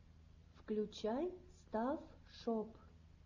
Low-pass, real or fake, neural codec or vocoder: 7.2 kHz; real; none